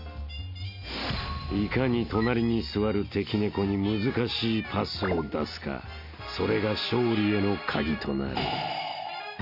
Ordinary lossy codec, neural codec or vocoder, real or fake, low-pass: MP3, 32 kbps; none; real; 5.4 kHz